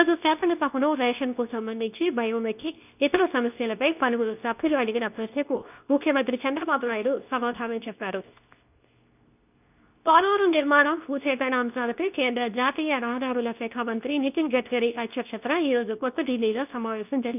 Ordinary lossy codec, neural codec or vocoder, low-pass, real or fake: none; codec, 24 kHz, 0.9 kbps, WavTokenizer, medium speech release version 2; 3.6 kHz; fake